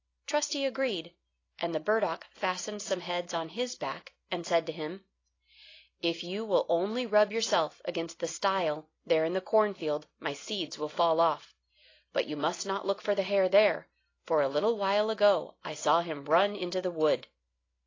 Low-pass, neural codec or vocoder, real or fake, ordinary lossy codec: 7.2 kHz; none; real; AAC, 32 kbps